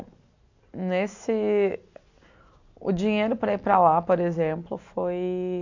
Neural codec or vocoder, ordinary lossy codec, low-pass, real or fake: none; AAC, 48 kbps; 7.2 kHz; real